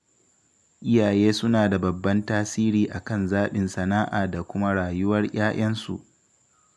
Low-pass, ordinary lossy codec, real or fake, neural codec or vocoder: none; none; real; none